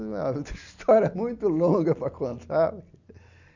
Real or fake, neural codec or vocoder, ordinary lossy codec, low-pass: real; none; none; 7.2 kHz